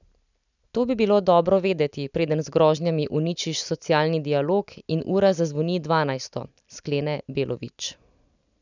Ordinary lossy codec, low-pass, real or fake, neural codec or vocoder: none; 7.2 kHz; real; none